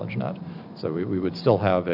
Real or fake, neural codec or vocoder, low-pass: real; none; 5.4 kHz